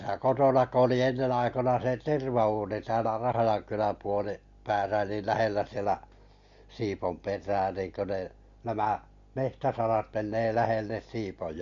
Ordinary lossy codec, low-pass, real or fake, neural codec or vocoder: MP3, 48 kbps; 7.2 kHz; real; none